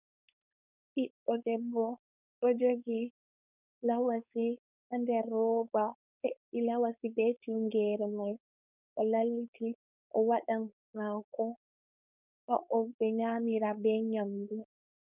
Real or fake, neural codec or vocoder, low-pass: fake; codec, 16 kHz, 4.8 kbps, FACodec; 3.6 kHz